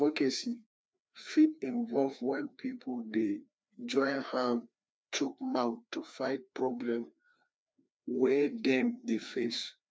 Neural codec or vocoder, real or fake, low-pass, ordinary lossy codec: codec, 16 kHz, 2 kbps, FreqCodec, larger model; fake; none; none